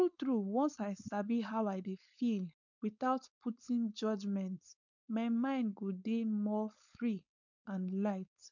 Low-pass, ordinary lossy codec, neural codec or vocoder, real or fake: 7.2 kHz; none; codec, 16 kHz, 4.8 kbps, FACodec; fake